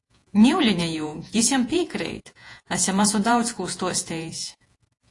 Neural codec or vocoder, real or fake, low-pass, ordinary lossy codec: vocoder, 48 kHz, 128 mel bands, Vocos; fake; 10.8 kHz; AAC, 48 kbps